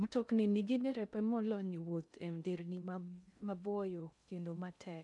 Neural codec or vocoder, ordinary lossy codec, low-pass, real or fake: codec, 16 kHz in and 24 kHz out, 0.6 kbps, FocalCodec, streaming, 2048 codes; none; 10.8 kHz; fake